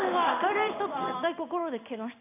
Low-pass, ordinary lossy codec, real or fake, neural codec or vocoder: 3.6 kHz; AAC, 32 kbps; fake; codec, 16 kHz in and 24 kHz out, 1 kbps, XY-Tokenizer